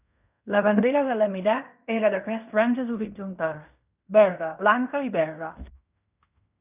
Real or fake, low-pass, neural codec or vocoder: fake; 3.6 kHz; codec, 16 kHz in and 24 kHz out, 0.9 kbps, LongCat-Audio-Codec, fine tuned four codebook decoder